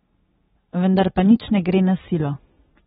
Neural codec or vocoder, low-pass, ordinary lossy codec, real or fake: none; 19.8 kHz; AAC, 16 kbps; real